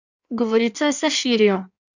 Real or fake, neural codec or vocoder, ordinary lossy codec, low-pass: fake; codec, 16 kHz in and 24 kHz out, 1.1 kbps, FireRedTTS-2 codec; none; 7.2 kHz